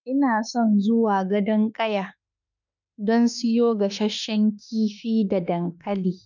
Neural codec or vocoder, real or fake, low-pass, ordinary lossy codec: autoencoder, 48 kHz, 32 numbers a frame, DAC-VAE, trained on Japanese speech; fake; 7.2 kHz; none